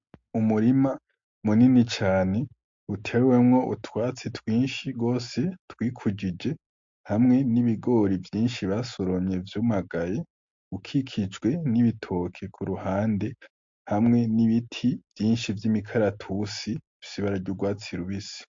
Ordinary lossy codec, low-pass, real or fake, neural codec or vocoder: MP3, 48 kbps; 7.2 kHz; real; none